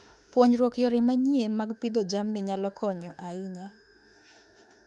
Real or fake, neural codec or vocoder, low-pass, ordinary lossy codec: fake; autoencoder, 48 kHz, 32 numbers a frame, DAC-VAE, trained on Japanese speech; 10.8 kHz; none